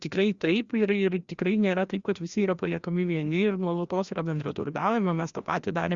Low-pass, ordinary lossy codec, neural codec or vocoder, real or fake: 7.2 kHz; Opus, 64 kbps; codec, 16 kHz, 1 kbps, FreqCodec, larger model; fake